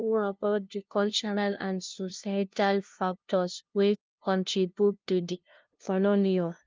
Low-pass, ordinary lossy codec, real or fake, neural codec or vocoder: 7.2 kHz; Opus, 32 kbps; fake; codec, 16 kHz, 0.5 kbps, FunCodec, trained on LibriTTS, 25 frames a second